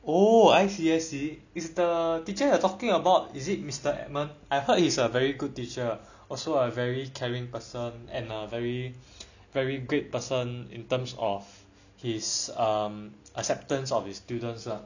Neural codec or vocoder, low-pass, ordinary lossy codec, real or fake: none; 7.2 kHz; none; real